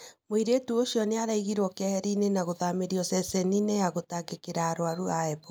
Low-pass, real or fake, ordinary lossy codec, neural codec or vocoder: none; real; none; none